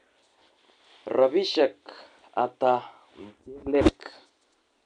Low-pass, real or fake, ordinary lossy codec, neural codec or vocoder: 9.9 kHz; real; AAC, 96 kbps; none